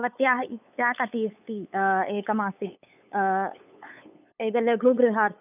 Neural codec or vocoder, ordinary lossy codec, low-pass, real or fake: codec, 16 kHz, 8 kbps, FunCodec, trained on LibriTTS, 25 frames a second; none; 3.6 kHz; fake